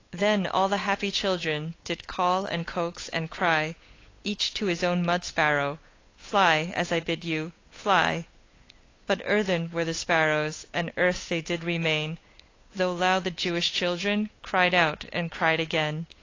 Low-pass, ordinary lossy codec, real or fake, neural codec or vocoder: 7.2 kHz; AAC, 32 kbps; fake; codec, 16 kHz, 8 kbps, FunCodec, trained on Chinese and English, 25 frames a second